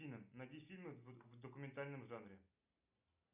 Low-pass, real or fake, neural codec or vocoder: 3.6 kHz; real; none